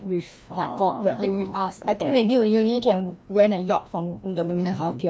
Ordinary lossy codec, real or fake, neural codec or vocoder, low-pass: none; fake; codec, 16 kHz, 1 kbps, FreqCodec, larger model; none